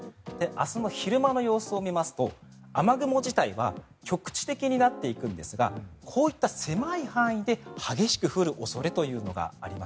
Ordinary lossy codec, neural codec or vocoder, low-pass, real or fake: none; none; none; real